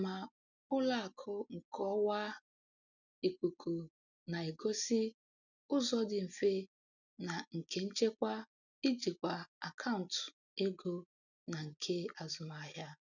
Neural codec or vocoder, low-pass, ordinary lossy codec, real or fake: none; 7.2 kHz; none; real